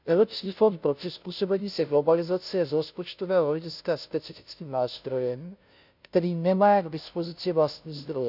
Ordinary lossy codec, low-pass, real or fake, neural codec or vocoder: none; 5.4 kHz; fake; codec, 16 kHz, 0.5 kbps, FunCodec, trained on Chinese and English, 25 frames a second